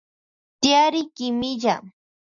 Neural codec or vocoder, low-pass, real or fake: none; 7.2 kHz; real